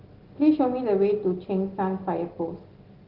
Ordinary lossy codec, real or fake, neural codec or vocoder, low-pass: Opus, 16 kbps; real; none; 5.4 kHz